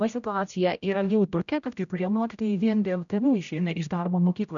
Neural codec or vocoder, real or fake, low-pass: codec, 16 kHz, 0.5 kbps, X-Codec, HuBERT features, trained on general audio; fake; 7.2 kHz